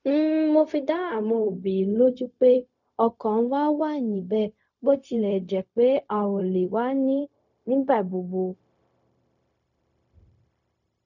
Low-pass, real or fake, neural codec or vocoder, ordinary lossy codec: 7.2 kHz; fake; codec, 16 kHz, 0.4 kbps, LongCat-Audio-Codec; none